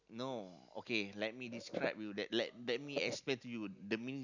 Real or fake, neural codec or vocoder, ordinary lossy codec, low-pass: real; none; none; 7.2 kHz